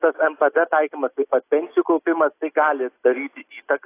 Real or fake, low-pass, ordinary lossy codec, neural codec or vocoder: real; 3.6 kHz; AAC, 24 kbps; none